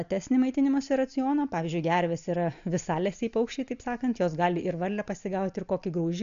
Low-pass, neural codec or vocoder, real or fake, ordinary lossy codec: 7.2 kHz; none; real; MP3, 96 kbps